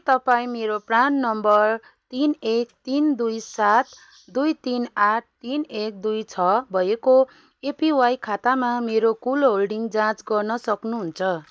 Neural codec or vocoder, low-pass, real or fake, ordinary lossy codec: none; none; real; none